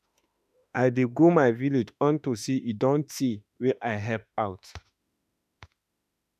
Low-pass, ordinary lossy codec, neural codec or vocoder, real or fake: 14.4 kHz; none; autoencoder, 48 kHz, 32 numbers a frame, DAC-VAE, trained on Japanese speech; fake